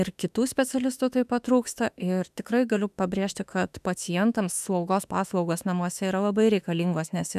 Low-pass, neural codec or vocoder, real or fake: 14.4 kHz; autoencoder, 48 kHz, 32 numbers a frame, DAC-VAE, trained on Japanese speech; fake